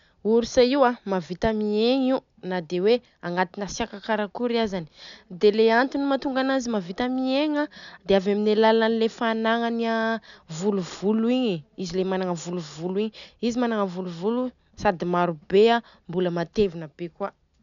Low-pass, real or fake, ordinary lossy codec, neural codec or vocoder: 7.2 kHz; real; none; none